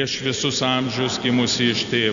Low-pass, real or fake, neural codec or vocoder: 7.2 kHz; real; none